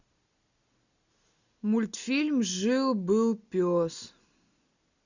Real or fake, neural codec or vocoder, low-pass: real; none; 7.2 kHz